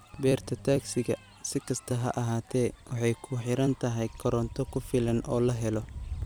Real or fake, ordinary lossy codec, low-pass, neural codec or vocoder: fake; none; none; vocoder, 44.1 kHz, 128 mel bands every 256 samples, BigVGAN v2